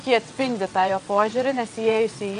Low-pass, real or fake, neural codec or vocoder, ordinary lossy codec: 9.9 kHz; fake; vocoder, 22.05 kHz, 80 mel bands, WaveNeXt; AAC, 96 kbps